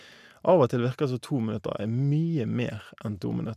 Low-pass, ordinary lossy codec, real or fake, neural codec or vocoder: 14.4 kHz; none; real; none